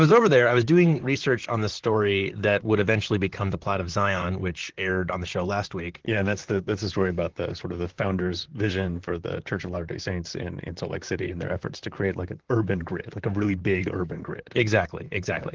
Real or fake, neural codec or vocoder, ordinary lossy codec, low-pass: fake; vocoder, 44.1 kHz, 128 mel bands, Pupu-Vocoder; Opus, 16 kbps; 7.2 kHz